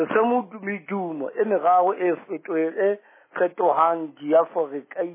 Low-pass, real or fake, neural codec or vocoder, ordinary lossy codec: 3.6 kHz; real; none; MP3, 16 kbps